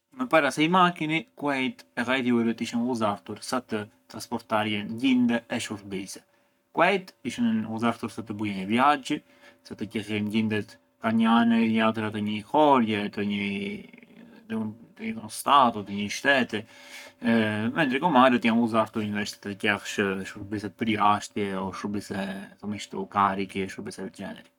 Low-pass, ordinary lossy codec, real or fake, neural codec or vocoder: 19.8 kHz; none; fake; codec, 44.1 kHz, 7.8 kbps, Pupu-Codec